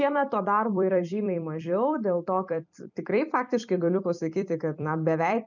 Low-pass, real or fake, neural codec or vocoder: 7.2 kHz; fake; vocoder, 44.1 kHz, 128 mel bands every 256 samples, BigVGAN v2